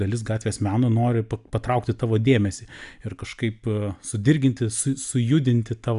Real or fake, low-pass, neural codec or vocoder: real; 10.8 kHz; none